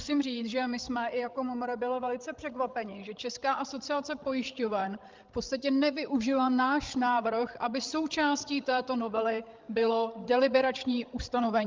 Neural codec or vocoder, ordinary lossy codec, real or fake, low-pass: codec, 16 kHz, 16 kbps, FreqCodec, larger model; Opus, 32 kbps; fake; 7.2 kHz